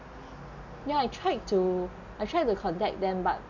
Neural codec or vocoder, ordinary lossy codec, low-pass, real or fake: none; none; 7.2 kHz; real